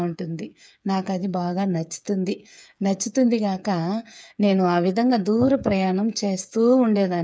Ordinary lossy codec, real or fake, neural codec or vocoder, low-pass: none; fake; codec, 16 kHz, 8 kbps, FreqCodec, smaller model; none